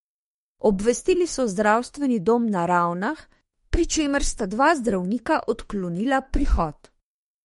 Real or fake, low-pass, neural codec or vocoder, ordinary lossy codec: fake; 19.8 kHz; autoencoder, 48 kHz, 32 numbers a frame, DAC-VAE, trained on Japanese speech; MP3, 48 kbps